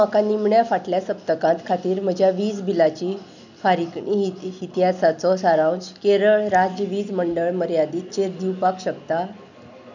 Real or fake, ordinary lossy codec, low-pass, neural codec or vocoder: real; none; 7.2 kHz; none